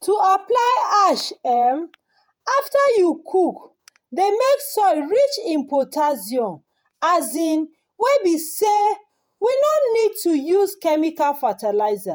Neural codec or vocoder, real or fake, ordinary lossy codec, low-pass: vocoder, 48 kHz, 128 mel bands, Vocos; fake; none; none